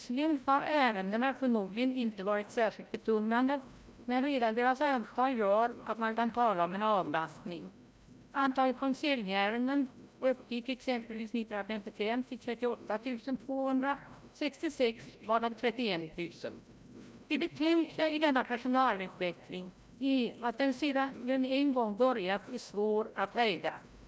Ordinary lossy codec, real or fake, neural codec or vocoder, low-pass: none; fake; codec, 16 kHz, 0.5 kbps, FreqCodec, larger model; none